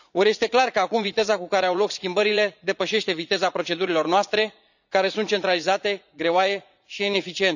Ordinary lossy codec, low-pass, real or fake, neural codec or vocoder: none; 7.2 kHz; fake; vocoder, 44.1 kHz, 80 mel bands, Vocos